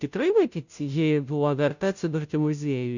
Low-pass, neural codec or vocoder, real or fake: 7.2 kHz; codec, 16 kHz, 0.5 kbps, FunCodec, trained on Chinese and English, 25 frames a second; fake